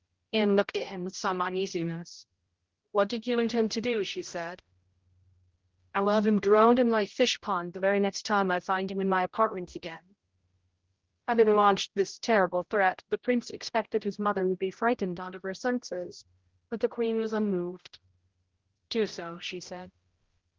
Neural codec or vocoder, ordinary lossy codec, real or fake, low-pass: codec, 16 kHz, 0.5 kbps, X-Codec, HuBERT features, trained on general audio; Opus, 16 kbps; fake; 7.2 kHz